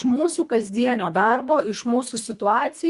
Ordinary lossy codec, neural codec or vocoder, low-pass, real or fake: Opus, 64 kbps; codec, 24 kHz, 1.5 kbps, HILCodec; 10.8 kHz; fake